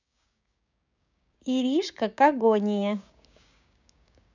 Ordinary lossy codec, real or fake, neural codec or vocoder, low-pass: none; fake; codec, 16 kHz, 6 kbps, DAC; 7.2 kHz